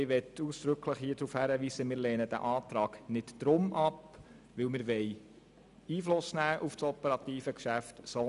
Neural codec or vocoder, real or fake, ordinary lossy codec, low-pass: none; real; none; 10.8 kHz